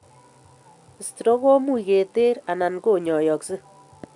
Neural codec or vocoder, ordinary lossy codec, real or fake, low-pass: none; none; real; 10.8 kHz